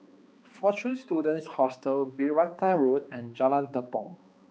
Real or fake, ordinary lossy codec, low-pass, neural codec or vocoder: fake; none; none; codec, 16 kHz, 2 kbps, X-Codec, HuBERT features, trained on balanced general audio